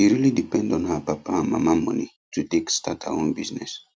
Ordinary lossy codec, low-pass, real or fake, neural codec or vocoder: none; none; real; none